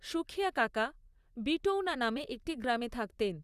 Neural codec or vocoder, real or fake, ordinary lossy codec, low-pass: vocoder, 44.1 kHz, 128 mel bands, Pupu-Vocoder; fake; Opus, 64 kbps; 14.4 kHz